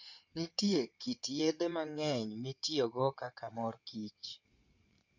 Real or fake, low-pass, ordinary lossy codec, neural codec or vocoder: fake; 7.2 kHz; none; codec, 16 kHz in and 24 kHz out, 2.2 kbps, FireRedTTS-2 codec